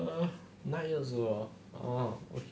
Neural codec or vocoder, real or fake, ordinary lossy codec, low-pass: none; real; none; none